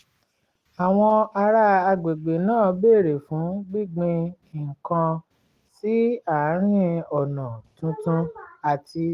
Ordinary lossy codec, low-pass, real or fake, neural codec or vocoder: Opus, 24 kbps; 14.4 kHz; real; none